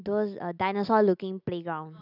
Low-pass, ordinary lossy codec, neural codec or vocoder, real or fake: 5.4 kHz; none; none; real